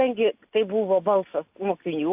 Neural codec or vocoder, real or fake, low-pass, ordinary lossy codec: none; real; 3.6 kHz; AAC, 32 kbps